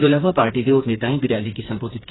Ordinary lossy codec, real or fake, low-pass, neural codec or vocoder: AAC, 16 kbps; fake; 7.2 kHz; codec, 16 kHz, 4 kbps, FreqCodec, smaller model